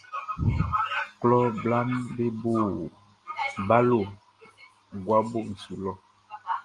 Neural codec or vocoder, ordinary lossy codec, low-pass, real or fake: none; Opus, 32 kbps; 10.8 kHz; real